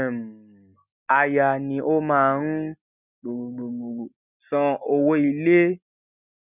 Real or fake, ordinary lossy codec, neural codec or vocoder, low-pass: real; none; none; 3.6 kHz